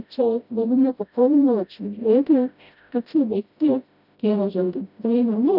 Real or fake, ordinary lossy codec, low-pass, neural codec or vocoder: fake; none; 5.4 kHz; codec, 16 kHz, 0.5 kbps, FreqCodec, smaller model